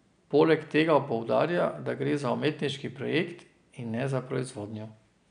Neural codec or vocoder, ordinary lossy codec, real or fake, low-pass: none; none; real; 9.9 kHz